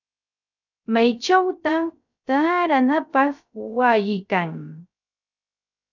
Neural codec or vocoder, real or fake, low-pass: codec, 16 kHz, 0.3 kbps, FocalCodec; fake; 7.2 kHz